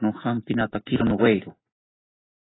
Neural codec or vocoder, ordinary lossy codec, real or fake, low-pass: none; AAC, 16 kbps; real; 7.2 kHz